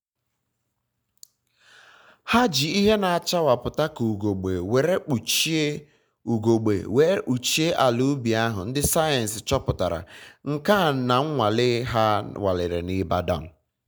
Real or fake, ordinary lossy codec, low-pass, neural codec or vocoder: real; none; none; none